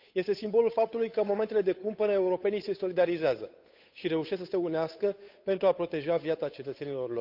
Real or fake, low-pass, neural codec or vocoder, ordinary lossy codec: fake; 5.4 kHz; codec, 16 kHz, 8 kbps, FunCodec, trained on Chinese and English, 25 frames a second; none